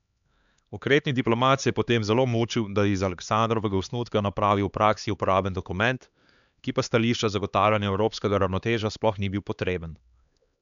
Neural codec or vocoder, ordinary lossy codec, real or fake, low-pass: codec, 16 kHz, 2 kbps, X-Codec, HuBERT features, trained on LibriSpeech; none; fake; 7.2 kHz